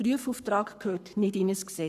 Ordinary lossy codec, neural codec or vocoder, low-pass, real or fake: none; codec, 44.1 kHz, 7.8 kbps, Pupu-Codec; 14.4 kHz; fake